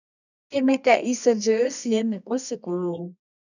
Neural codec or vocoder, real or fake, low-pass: codec, 24 kHz, 0.9 kbps, WavTokenizer, medium music audio release; fake; 7.2 kHz